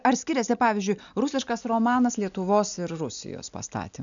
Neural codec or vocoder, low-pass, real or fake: none; 7.2 kHz; real